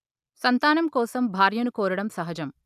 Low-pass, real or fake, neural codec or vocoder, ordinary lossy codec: 14.4 kHz; real; none; none